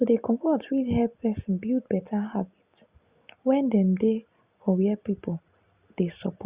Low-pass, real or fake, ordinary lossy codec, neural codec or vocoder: 3.6 kHz; real; Opus, 64 kbps; none